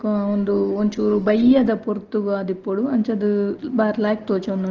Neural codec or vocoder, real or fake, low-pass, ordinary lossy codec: none; real; 7.2 kHz; Opus, 16 kbps